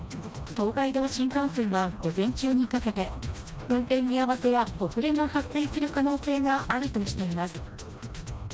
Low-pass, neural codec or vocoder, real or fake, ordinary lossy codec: none; codec, 16 kHz, 1 kbps, FreqCodec, smaller model; fake; none